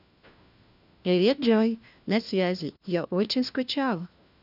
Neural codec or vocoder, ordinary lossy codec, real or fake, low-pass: codec, 16 kHz, 1 kbps, FunCodec, trained on LibriTTS, 50 frames a second; none; fake; 5.4 kHz